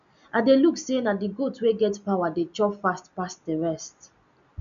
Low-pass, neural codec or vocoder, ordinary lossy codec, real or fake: 7.2 kHz; none; none; real